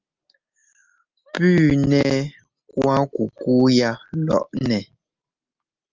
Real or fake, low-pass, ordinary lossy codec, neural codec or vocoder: real; 7.2 kHz; Opus, 32 kbps; none